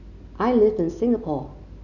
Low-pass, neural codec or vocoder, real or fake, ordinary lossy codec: 7.2 kHz; none; real; none